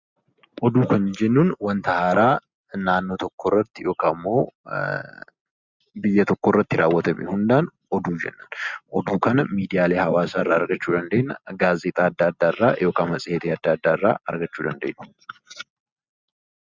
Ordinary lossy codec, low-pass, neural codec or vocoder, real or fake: Opus, 64 kbps; 7.2 kHz; none; real